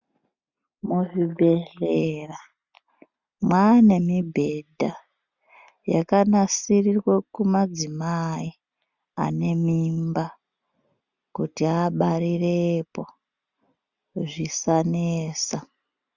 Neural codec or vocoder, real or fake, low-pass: none; real; 7.2 kHz